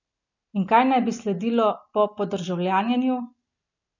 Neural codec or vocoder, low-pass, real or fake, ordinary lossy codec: none; 7.2 kHz; real; none